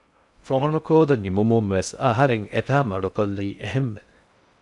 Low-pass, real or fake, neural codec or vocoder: 10.8 kHz; fake; codec, 16 kHz in and 24 kHz out, 0.6 kbps, FocalCodec, streaming, 2048 codes